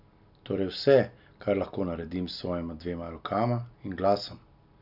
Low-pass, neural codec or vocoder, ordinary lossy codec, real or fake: 5.4 kHz; none; none; real